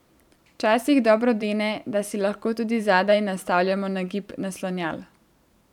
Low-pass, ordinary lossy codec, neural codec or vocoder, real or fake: 19.8 kHz; none; vocoder, 44.1 kHz, 128 mel bands every 512 samples, BigVGAN v2; fake